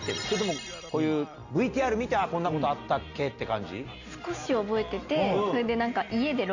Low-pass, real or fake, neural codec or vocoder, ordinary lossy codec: 7.2 kHz; real; none; none